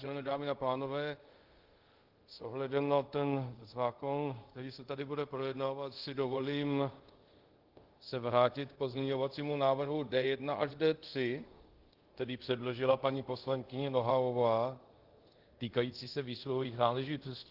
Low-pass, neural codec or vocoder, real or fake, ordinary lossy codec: 5.4 kHz; codec, 24 kHz, 0.5 kbps, DualCodec; fake; Opus, 16 kbps